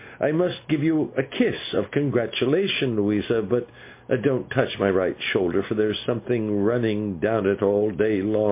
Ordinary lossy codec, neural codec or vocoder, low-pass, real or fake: MP3, 24 kbps; none; 3.6 kHz; real